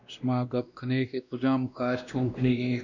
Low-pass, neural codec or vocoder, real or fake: 7.2 kHz; codec, 16 kHz, 1 kbps, X-Codec, WavLM features, trained on Multilingual LibriSpeech; fake